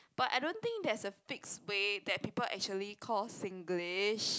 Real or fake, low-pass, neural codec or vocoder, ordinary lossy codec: real; none; none; none